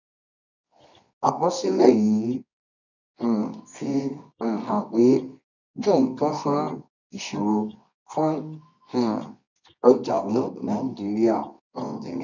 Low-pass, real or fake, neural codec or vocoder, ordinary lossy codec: 7.2 kHz; fake; codec, 24 kHz, 0.9 kbps, WavTokenizer, medium music audio release; none